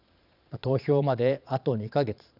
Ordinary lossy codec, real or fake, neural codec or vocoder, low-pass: none; fake; vocoder, 22.05 kHz, 80 mel bands, Vocos; 5.4 kHz